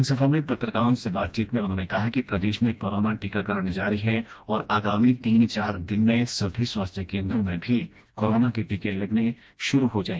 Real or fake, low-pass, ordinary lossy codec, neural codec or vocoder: fake; none; none; codec, 16 kHz, 1 kbps, FreqCodec, smaller model